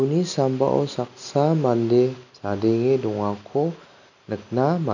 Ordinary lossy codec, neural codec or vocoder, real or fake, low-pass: none; none; real; 7.2 kHz